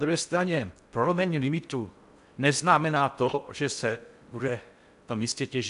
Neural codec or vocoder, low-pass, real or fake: codec, 16 kHz in and 24 kHz out, 0.6 kbps, FocalCodec, streaming, 4096 codes; 10.8 kHz; fake